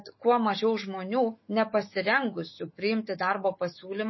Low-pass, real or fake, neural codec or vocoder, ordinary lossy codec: 7.2 kHz; fake; autoencoder, 48 kHz, 128 numbers a frame, DAC-VAE, trained on Japanese speech; MP3, 24 kbps